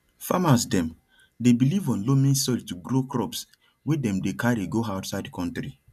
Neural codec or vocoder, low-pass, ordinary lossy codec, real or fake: none; 14.4 kHz; none; real